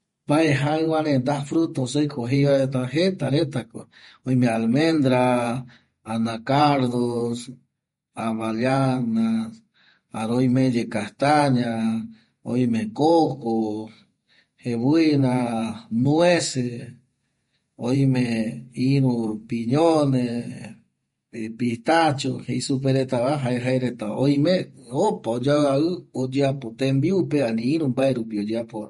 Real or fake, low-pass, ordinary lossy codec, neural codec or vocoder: fake; 19.8 kHz; MP3, 48 kbps; vocoder, 48 kHz, 128 mel bands, Vocos